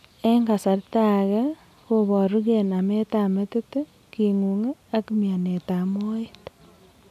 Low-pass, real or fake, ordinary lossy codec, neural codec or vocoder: 14.4 kHz; real; none; none